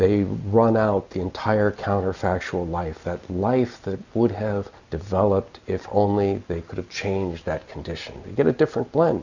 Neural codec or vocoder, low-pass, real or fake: none; 7.2 kHz; real